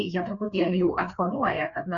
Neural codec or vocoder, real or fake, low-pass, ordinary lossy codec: codec, 16 kHz, 2 kbps, FreqCodec, larger model; fake; 7.2 kHz; Opus, 64 kbps